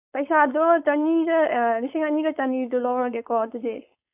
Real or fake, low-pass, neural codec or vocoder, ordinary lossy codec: fake; 3.6 kHz; codec, 16 kHz, 4.8 kbps, FACodec; none